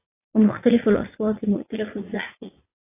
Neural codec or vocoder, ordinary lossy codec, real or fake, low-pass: vocoder, 44.1 kHz, 80 mel bands, Vocos; AAC, 24 kbps; fake; 3.6 kHz